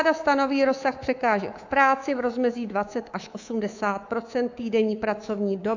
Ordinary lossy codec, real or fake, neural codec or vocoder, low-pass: AAC, 48 kbps; fake; codec, 24 kHz, 3.1 kbps, DualCodec; 7.2 kHz